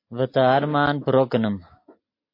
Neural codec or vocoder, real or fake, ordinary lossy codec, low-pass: none; real; MP3, 32 kbps; 5.4 kHz